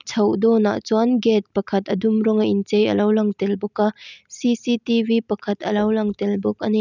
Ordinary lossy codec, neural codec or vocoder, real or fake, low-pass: none; none; real; 7.2 kHz